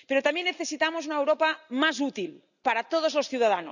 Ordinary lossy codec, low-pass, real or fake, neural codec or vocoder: none; 7.2 kHz; real; none